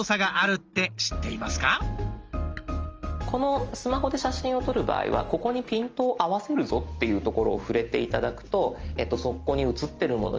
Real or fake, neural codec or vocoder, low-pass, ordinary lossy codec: real; none; 7.2 kHz; Opus, 24 kbps